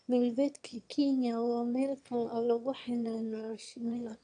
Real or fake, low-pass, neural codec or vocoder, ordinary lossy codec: fake; 9.9 kHz; autoencoder, 22.05 kHz, a latent of 192 numbers a frame, VITS, trained on one speaker; AAC, 64 kbps